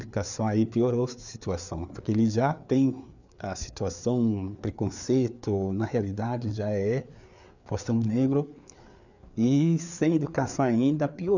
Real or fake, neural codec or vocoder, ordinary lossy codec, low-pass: fake; codec, 16 kHz, 4 kbps, FreqCodec, larger model; none; 7.2 kHz